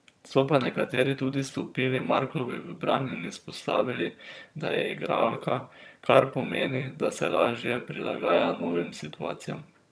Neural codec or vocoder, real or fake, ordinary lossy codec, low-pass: vocoder, 22.05 kHz, 80 mel bands, HiFi-GAN; fake; none; none